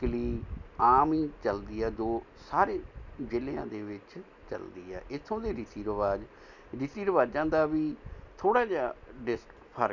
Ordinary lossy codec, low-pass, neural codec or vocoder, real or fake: none; 7.2 kHz; none; real